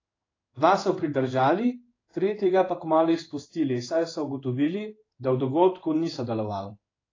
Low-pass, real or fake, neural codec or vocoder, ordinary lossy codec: 7.2 kHz; fake; codec, 16 kHz in and 24 kHz out, 1 kbps, XY-Tokenizer; AAC, 32 kbps